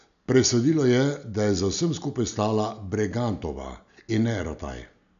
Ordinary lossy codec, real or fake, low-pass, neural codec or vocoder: none; real; 7.2 kHz; none